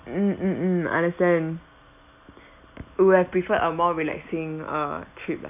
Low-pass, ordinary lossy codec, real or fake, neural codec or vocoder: 3.6 kHz; none; real; none